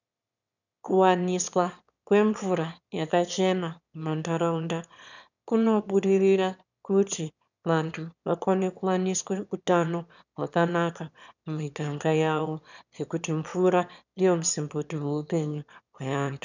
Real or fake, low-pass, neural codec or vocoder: fake; 7.2 kHz; autoencoder, 22.05 kHz, a latent of 192 numbers a frame, VITS, trained on one speaker